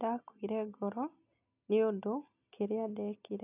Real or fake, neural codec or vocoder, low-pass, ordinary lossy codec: real; none; 3.6 kHz; none